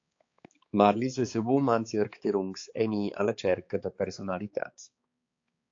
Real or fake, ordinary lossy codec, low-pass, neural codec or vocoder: fake; AAC, 48 kbps; 7.2 kHz; codec, 16 kHz, 4 kbps, X-Codec, HuBERT features, trained on balanced general audio